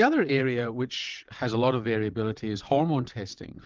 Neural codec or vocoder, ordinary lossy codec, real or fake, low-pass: vocoder, 22.05 kHz, 80 mel bands, WaveNeXt; Opus, 32 kbps; fake; 7.2 kHz